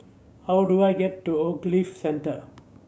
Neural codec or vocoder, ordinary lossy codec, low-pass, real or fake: codec, 16 kHz, 6 kbps, DAC; none; none; fake